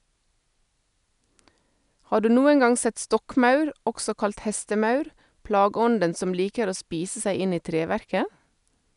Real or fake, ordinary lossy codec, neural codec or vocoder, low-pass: real; none; none; 10.8 kHz